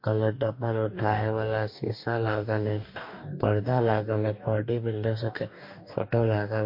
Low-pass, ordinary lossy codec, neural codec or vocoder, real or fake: 5.4 kHz; MP3, 32 kbps; codec, 44.1 kHz, 2.6 kbps, DAC; fake